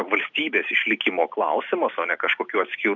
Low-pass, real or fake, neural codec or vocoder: 7.2 kHz; real; none